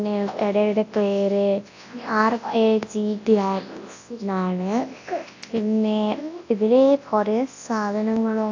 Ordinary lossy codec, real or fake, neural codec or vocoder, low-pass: AAC, 48 kbps; fake; codec, 24 kHz, 0.9 kbps, WavTokenizer, large speech release; 7.2 kHz